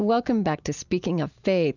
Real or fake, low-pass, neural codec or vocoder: real; 7.2 kHz; none